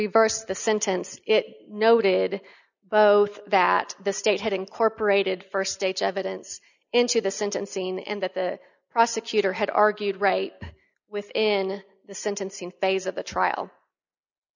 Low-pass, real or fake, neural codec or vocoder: 7.2 kHz; real; none